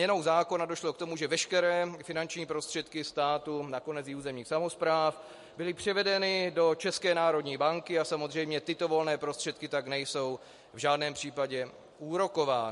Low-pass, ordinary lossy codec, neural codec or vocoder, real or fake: 14.4 kHz; MP3, 48 kbps; none; real